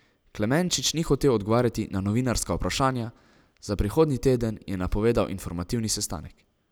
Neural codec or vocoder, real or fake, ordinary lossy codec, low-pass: none; real; none; none